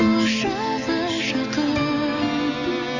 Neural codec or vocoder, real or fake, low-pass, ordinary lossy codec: none; real; 7.2 kHz; none